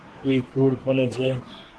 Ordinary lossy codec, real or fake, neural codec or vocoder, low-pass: Opus, 16 kbps; fake; codec, 24 kHz, 1 kbps, SNAC; 10.8 kHz